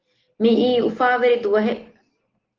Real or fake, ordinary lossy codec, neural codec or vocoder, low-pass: real; Opus, 16 kbps; none; 7.2 kHz